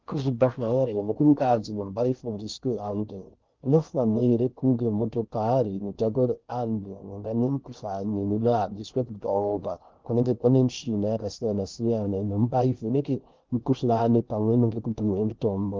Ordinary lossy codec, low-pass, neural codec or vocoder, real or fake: Opus, 32 kbps; 7.2 kHz; codec, 16 kHz in and 24 kHz out, 0.6 kbps, FocalCodec, streaming, 2048 codes; fake